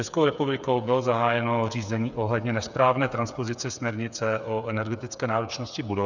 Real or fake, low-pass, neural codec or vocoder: fake; 7.2 kHz; codec, 16 kHz, 8 kbps, FreqCodec, smaller model